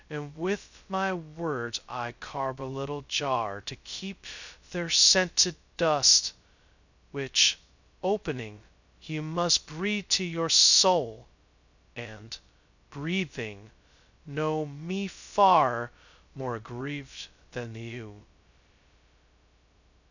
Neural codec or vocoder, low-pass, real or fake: codec, 16 kHz, 0.2 kbps, FocalCodec; 7.2 kHz; fake